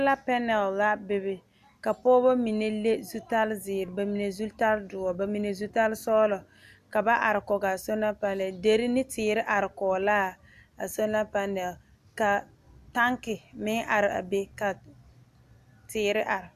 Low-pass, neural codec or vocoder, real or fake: 14.4 kHz; none; real